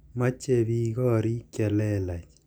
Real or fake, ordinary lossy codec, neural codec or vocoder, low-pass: real; none; none; none